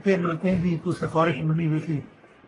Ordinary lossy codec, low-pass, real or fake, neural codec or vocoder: AAC, 32 kbps; 10.8 kHz; fake; codec, 44.1 kHz, 1.7 kbps, Pupu-Codec